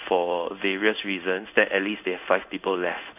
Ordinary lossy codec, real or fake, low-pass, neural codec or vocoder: none; fake; 3.6 kHz; codec, 16 kHz in and 24 kHz out, 1 kbps, XY-Tokenizer